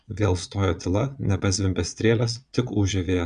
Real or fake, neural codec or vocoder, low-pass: fake; vocoder, 22.05 kHz, 80 mel bands, Vocos; 9.9 kHz